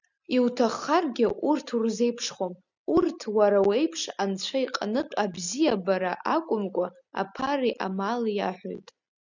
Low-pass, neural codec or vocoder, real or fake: 7.2 kHz; none; real